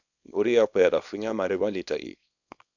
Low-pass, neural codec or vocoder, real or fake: 7.2 kHz; codec, 24 kHz, 0.9 kbps, WavTokenizer, small release; fake